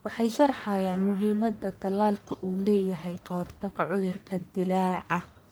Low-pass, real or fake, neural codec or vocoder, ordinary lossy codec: none; fake; codec, 44.1 kHz, 1.7 kbps, Pupu-Codec; none